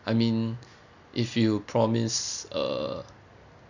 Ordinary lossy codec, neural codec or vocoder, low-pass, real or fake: none; none; 7.2 kHz; real